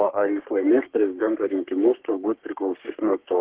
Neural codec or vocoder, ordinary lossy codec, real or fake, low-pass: codec, 44.1 kHz, 3.4 kbps, Pupu-Codec; Opus, 16 kbps; fake; 3.6 kHz